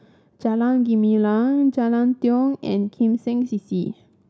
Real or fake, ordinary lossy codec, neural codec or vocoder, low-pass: real; none; none; none